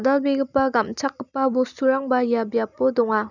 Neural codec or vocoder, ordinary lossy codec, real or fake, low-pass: none; none; real; 7.2 kHz